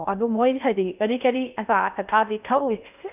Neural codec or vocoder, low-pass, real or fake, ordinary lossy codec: codec, 16 kHz in and 24 kHz out, 0.6 kbps, FocalCodec, streaming, 2048 codes; 3.6 kHz; fake; none